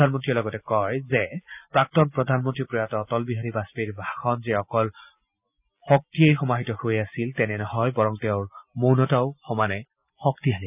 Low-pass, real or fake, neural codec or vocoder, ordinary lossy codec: 3.6 kHz; real; none; none